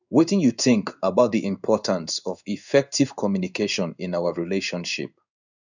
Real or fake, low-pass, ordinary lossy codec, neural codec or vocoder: fake; 7.2 kHz; none; codec, 16 kHz in and 24 kHz out, 1 kbps, XY-Tokenizer